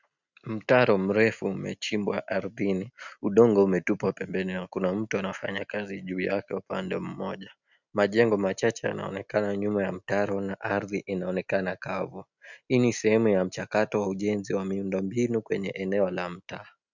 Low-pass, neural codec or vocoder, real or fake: 7.2 kHz; none; real